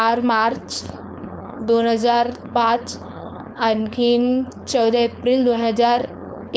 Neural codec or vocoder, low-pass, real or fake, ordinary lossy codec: codec, 16 kHz, 4.8 kbps, FACodec; none; fake; none